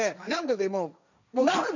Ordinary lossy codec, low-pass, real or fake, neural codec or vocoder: none; 7.2 kHz; fake; codec, 16 kHz, 1.1 kbps, Voila-Tokenizer